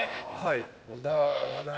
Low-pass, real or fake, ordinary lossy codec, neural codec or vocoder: none; fake; none; codec, 16 kHz, 0.8 kbps, ZipCodec